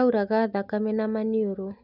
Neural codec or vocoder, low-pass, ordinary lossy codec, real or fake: none; 5.4 kHz; none; real